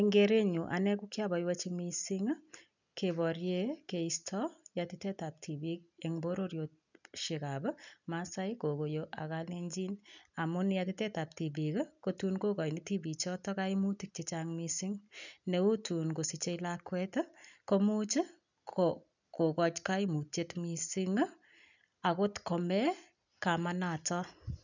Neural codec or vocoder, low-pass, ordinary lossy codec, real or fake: none; 7.2 kHz; none; real